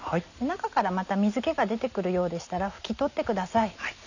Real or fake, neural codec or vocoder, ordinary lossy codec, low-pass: real; none; none; 7.2 kHz